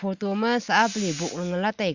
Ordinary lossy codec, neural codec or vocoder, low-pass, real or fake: Opus, 64 kbps; none; 7.2 kHz; real